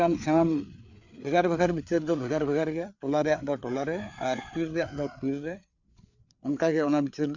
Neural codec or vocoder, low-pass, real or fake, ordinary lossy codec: codec, 16 kHz, 4 kbps, FreqCodec, larger model; 7.2 kHz; fake; none